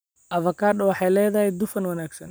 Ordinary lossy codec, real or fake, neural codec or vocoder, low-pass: none; real; none; none